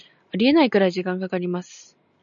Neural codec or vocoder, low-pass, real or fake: none; 7.2 kHz; real